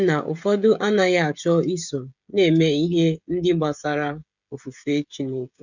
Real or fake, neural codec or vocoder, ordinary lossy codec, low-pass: fake; codec, 16 kHz, 16 kbps, FreqCodec, smaller model; none; 7.2 kHz